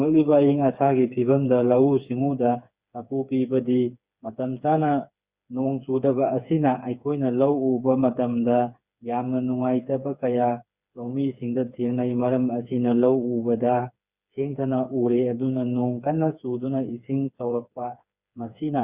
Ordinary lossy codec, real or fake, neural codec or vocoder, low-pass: Opus, 64 kbps; fake; codec, 16 kHz, 4 kbps, FreqCodec, smaller model; 3.6 kHz